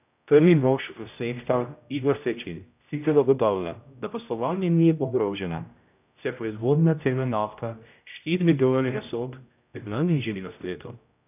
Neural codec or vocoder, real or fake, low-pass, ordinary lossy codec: codec, 16 kHz, 0.5 kbps, X-Codec, HuBERT features, trained on general audio; fake; 3.6 kHz; none